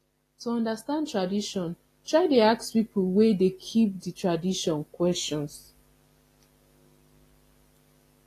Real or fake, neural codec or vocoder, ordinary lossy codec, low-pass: real; none; AAC, 48 kbps; 14.4 kHz